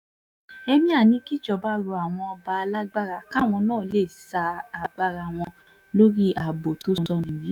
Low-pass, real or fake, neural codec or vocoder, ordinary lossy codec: 19.8 kHz; fake; autoencoder, 48 kHz, 128 numbers a frame, DAC-VAE, trained on Japanese speech; none